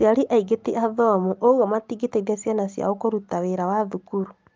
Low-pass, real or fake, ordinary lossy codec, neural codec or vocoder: 7.2 kHz; real; Opus, 24 kbps; none